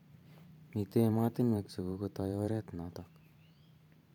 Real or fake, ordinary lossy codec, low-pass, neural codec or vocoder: fake; none; 19.8 kHz; vocoder, 44.1 kHz, 128 mel bands every 512 samples, BigVGAN v2